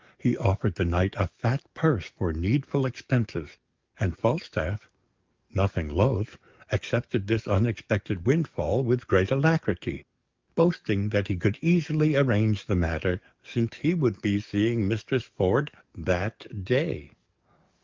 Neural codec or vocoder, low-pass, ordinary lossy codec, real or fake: codec, 44.1 kHz, 7.8 kbps, DAC; 7.2 kHz; Opus, 24 kbps; fake